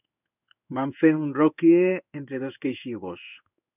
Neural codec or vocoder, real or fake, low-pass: codec, 16 kHz in and 24 kHz out, 1 kbps, XY-Tokenizer; fake; 3.6 kHz